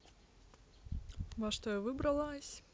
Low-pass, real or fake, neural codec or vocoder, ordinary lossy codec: none; real; none; none